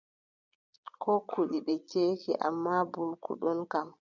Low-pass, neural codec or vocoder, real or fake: 7.2 kHz; vocoder, 22.05 kHz, 80 mel bands, Vocos; fake